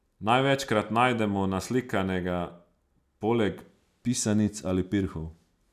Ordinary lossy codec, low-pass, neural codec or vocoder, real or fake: none; 14.4 kHz; none; real